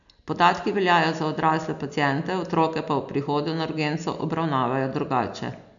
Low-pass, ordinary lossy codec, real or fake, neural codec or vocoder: 7.2 kHz; none; real; none